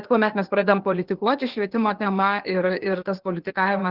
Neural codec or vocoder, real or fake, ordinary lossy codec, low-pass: codec, 16 kHz, 0.8 kbps, ZipCodec; fake; Opus, 16 kbps; 5.4 kHz